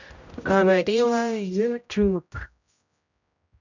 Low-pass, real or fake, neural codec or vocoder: 7.2 kHz; fake; codec, 16 kHz, 0.5 kbps, X-Codec, HuBERT features, trained on general audio